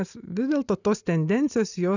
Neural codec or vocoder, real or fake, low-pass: none; real; 7.2 kHz